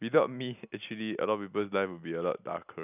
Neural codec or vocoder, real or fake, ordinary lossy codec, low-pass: none; real; none; 3.6 kHz